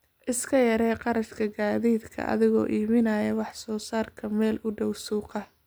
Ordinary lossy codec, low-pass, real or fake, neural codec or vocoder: none; none; real; none